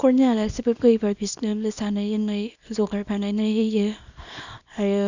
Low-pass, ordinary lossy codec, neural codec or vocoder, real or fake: 7.2 kHz; none; codec, 24 kHz, 0.9 kbps, WavTokenizer, small release; fake